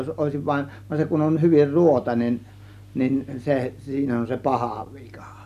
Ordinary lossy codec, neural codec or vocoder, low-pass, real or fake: none; none; 14.4 kHz; real